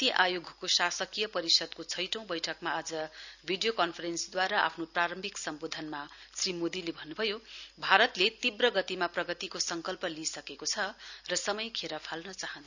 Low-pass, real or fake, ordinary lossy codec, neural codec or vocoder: 7.2 kHz; real; none; none